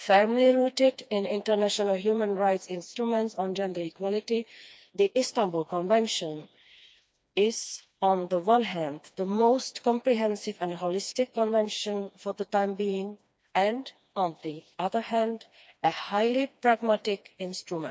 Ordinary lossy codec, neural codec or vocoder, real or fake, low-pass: none; codec, 16 kHz, 2 kbps, FreqCodec, smaller model; fake; none